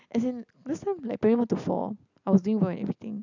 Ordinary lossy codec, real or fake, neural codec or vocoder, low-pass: none; real; none; 7.2 kHz